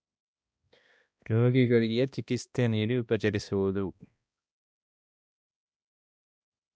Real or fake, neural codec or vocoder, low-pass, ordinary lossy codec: fake; codec, 16 kHz, 1 kbps, X-Codec, HuBERT features, trained on balanced general audio; none; none